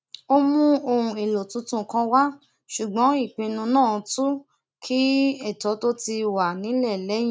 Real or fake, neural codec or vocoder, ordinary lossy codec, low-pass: real; none; none; none